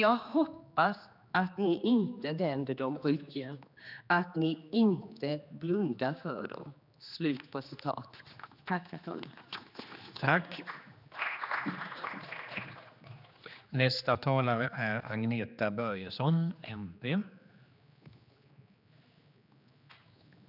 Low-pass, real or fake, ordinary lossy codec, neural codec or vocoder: 5.4 kHz; fake; none; codec, 16 kHz, 2 kbps, X-Codec, HuBERT features, trained on general audio